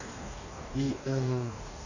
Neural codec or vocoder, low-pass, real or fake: codec, 44.1 kHz, 2.6 kbps, DAC; 7.2 kHz; fake